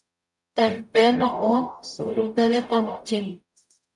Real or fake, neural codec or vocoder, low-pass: fake; codec, 44.1 kHz, 0.9 kbps, DAC; 10.8 kHz